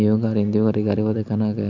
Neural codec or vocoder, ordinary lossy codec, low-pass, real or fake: none; MP3, 64 kbps; 7.2 kHz; real